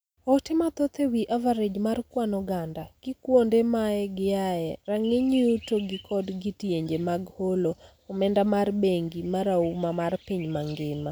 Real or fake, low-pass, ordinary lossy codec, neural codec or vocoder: real; none; none; none